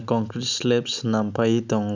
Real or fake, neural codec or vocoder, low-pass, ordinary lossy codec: real; none; 7.2 kHz; none